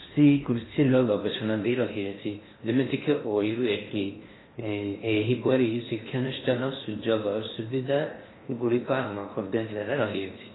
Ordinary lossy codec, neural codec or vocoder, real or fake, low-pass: AAC, 16 kbps; codec, 16 kHz in and 24 kHz out, 0.6 kbps, FocalCodec, streaming, 4096 codes; fake; 7.2 kHz